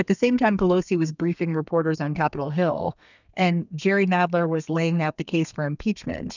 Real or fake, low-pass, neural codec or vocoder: fake; 7.2 kHz; codec, 32 kHz, 1.9 kbps, SNAC